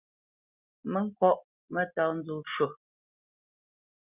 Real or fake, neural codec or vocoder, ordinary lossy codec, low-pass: real; none; Opus, 64 kbps; 3.6 kHz